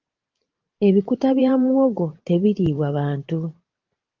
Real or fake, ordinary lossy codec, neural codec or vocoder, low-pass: fake; Opus, 24 kbps; vocoder, 44.1 kHz, 128 mel bands every 512 samples, BigVGAN v2; 7.2 kHz